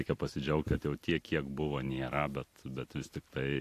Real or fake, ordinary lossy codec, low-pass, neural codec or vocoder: real; AAC, 64 kbps; 14.4 kHz; none